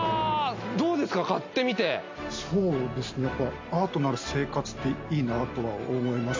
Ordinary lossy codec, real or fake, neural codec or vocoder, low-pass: MP3, 48 kbps; real; none; 7.2 kHz